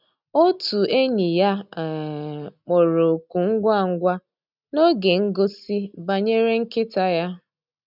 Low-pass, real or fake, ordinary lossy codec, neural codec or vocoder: 5.4 kHz; real; none; none